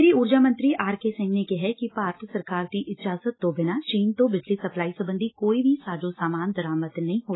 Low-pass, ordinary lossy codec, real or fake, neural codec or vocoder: 7.2 kHz; AAC, 16 kbps; real; none